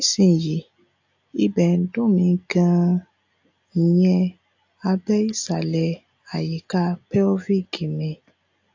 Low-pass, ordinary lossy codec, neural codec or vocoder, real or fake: 7.2 kHz; AAC, 48 kbps; none; real